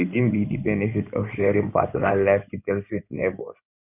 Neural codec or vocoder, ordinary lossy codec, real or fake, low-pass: codec, 16 kHz in and 24 kHz out, 2.2 kbps, FireRedTTS-2 codec; none; fake; 3.6 kHz